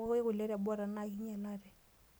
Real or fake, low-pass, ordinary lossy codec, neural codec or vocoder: real; none; none; none